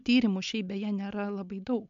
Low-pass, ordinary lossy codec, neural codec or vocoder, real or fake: 7.2 kHz; MP3, 64 kbps; none; real